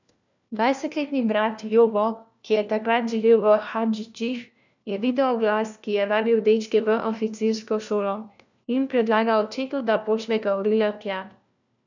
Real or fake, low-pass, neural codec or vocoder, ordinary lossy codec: fake; 7.2 kHz; codec, 16 kHz, 1 kbps, FunCodec, trained on LibriTTS, 50 frames a second; none